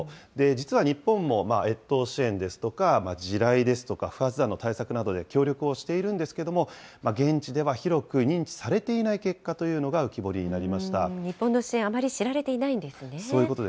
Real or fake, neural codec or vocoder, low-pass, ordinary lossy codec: real; none; none; none